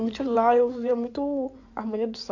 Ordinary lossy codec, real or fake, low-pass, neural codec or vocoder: AAC, 48 kbps; fake; 7.2 kHz; codec, 16 kHz in and 24 kHz out, 2.2 kbps, FireRedTTS-2 codec